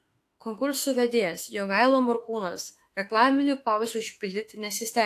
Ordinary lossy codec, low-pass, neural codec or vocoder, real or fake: AAC, 64 kbps; 14.4 kHz; autoencoder, 48 kHz, 32 numbers a frame, DAC-VAE, trained on Japanese speech; fake